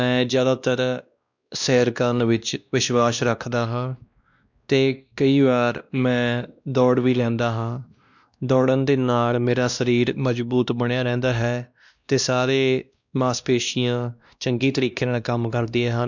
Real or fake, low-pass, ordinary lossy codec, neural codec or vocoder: fake; 7.2 kHz; none; codec, 16 kHz, 1 kbps, X-Codec, WavLM features, trained on Multilingual LibriSpeech